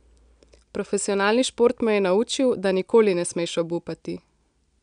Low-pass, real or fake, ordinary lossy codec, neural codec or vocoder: 9.9 kHz; real; none; none